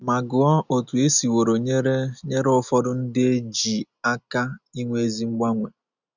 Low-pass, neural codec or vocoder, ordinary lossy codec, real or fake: 7.2 kHz; none; none; real